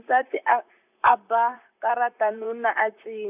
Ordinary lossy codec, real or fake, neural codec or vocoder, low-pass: none; fake; vocoder, 44.1 kHz, 128 mel bands, Pupu-Vocoder; 3.6 kHz